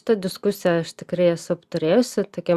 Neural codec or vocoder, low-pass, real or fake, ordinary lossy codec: none; 14.4 kHz; real; Opus, 64 kbps